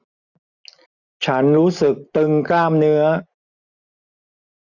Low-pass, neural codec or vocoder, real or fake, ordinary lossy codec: 7.2 kHz; none; real; none